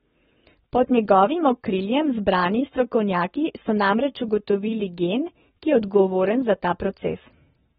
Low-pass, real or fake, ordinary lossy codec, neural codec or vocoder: 19.8 kHz; fake; AAC, 16 kbps; codec, 44.1 kHz, 7.8 kbps, Pupu-Codec